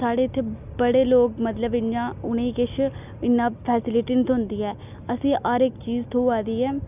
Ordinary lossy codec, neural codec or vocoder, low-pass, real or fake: none; none; 3.6 kHz; real